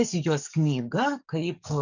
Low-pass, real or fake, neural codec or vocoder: 7.2 kHz; fake; codec, 44.1 kHz, 7.8 kbps, DAC